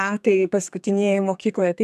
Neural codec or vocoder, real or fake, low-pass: codec, 32 kHz, 1.9 kbps, SNAC; fake; 14.4 kHz